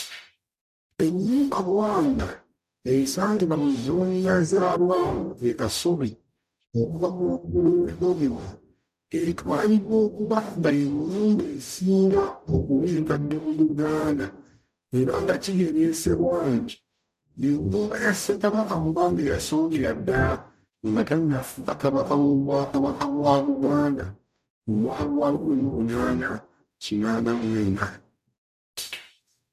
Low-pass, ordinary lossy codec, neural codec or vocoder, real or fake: 14.4 kHz; none; codec, 44.1 kHz, 0.9 kbps, DAC; fake